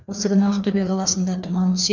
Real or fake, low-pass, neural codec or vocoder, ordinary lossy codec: fake; 7.2 kHz; codec, 16 kHz, 2 kbps, FreqCodec, larger model; none